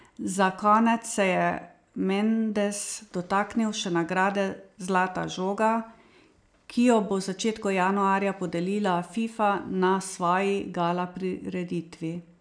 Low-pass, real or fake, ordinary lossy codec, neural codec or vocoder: 9.9 kHz; real; none; none